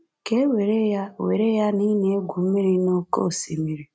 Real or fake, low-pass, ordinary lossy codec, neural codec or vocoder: real; none; none; none